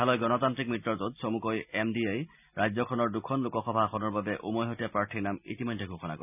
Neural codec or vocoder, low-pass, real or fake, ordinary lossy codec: none; 3.6 kHz; real; none